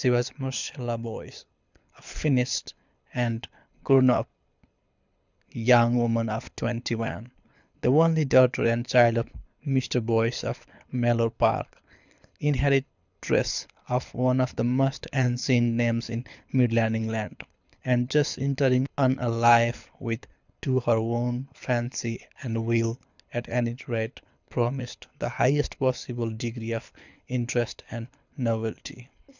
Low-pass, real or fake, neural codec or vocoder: 7.2 kHz; fake; codec, 24 kHz, 6 kbps, HILCodec